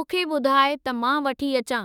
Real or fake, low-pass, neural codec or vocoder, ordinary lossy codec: fake; 19.8 kHz; autoencoder, 48 kHz, 32 numbers a frame, DAC-VAE, trained on Japanese speech; none